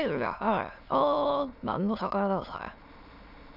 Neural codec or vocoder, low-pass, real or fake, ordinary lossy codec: autoencoder, 22.05 kHz, a latent of 192 numbers a frame, VITS, trained on many speakers; 5.4 kHz; fake; none